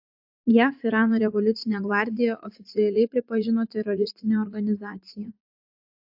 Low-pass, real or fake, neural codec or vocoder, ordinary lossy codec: 5.4 kHz; fake; vocoder, 44.1 kHz, 80 mel bands, Vocos; AAC, 48 kbps